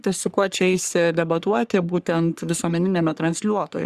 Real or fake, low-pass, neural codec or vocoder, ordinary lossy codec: fake; 14.4 kHz; codec, 44.1 kHz, 3.4 kbps, Pupu-Codec; Opus, 64 kbps